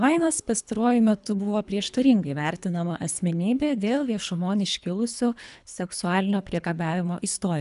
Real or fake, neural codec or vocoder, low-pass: fake; codec, 24 kHz, 3 kbps, HILCodec; 10.8 kHz